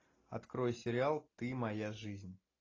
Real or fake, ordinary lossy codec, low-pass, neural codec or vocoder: real; AAC, 32 kbps; 7.2 kHz; none